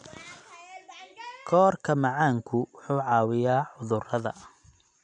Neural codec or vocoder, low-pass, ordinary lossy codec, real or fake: none; 9.9 kHz; none; real